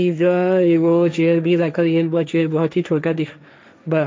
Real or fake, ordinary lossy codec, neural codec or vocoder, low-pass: fake; none; codec, 16 kHz, 1.1 kbps, Voila-Tokenizer; none